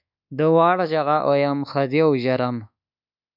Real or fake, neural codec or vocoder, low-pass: fake; codec, 24 kHz, 1.2 kbps, DualCodec; 5.4 kHz